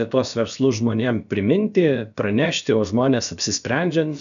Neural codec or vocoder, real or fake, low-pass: codec, 16 kHz, about 1 kbps, DyCAST, with the encoder's durations; fake; 7.2 kHz